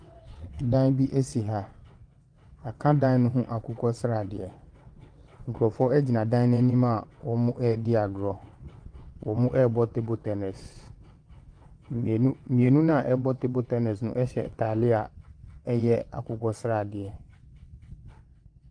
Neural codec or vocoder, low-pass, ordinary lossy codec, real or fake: vocoder, 22.05 kHz, 80 mel bands, WaveNeXt; 9.9 kHz; Opus, 32 kbps; fake